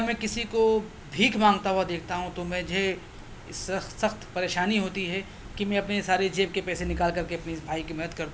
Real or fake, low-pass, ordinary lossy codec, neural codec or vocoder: real; none; none; none